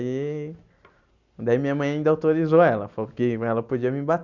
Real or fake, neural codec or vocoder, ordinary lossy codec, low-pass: real; none; none; 7.2 kHz